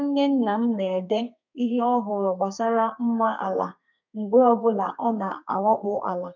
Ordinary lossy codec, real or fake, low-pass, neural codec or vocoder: MP3, 64 kbps; fake; 7.2 kHz; codec, 32 kHz, 1.9 kbps, SNAC